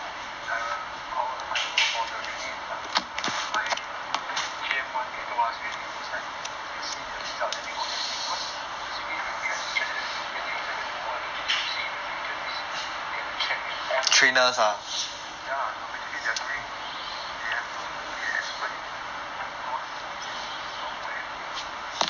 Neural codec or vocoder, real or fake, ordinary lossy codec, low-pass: none; real; none; 7.2 kHz